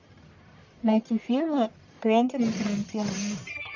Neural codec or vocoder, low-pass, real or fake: codec, 44.1 kHz, 1.7 kbps, Pupu-Codec; 7.2 kHz; fake